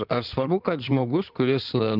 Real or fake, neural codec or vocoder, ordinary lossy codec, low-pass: fake; codec, 16 kHz in and 24 kHz out, 2.2 kbps, FireRedTTS-2 codec; Opus, 24 kbps; 5.4 kHz